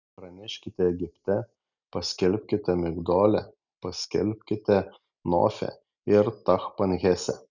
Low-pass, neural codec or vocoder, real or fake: 7.2 kHz; none; real